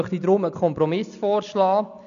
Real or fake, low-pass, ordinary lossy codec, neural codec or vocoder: real; 7.2 kHz; none; none